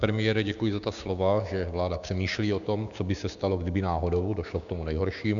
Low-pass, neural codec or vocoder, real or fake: 7.2 kHz; codec, 16 kHz, 6 kbps, DAC; fake